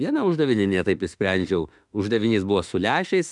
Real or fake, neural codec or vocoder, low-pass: fake; autoencoder, 48 kHz, 32 numbers a frame, DAC-VAE, trained on Japanese speech; 10.8 kHz